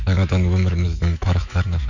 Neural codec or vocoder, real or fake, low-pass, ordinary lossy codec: autoencoder, 48 kHz, 128 numbers a frame, DAC-VAE, trained on Japanese speech; fake; 7.2 kHz; none